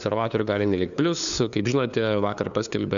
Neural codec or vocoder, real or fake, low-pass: codec, 16 kHz, 2 kbps, FunCodec, trained on LibriTTS, 25 frames a second; fake; 7.2 kHz